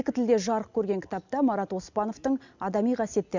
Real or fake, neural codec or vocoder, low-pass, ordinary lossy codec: real; none; 7.2 kHz; none